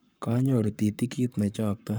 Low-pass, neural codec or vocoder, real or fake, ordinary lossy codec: none; codec, 44.1 kHz, 7.8 kbps, Pupu-Codec; fake; none